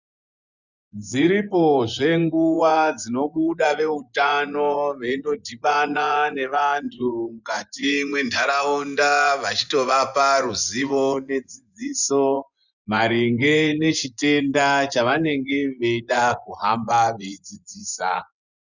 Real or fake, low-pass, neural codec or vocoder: fake; 7.2 kHz; vocoder, 24 kHz, 100 mel bands, Vocos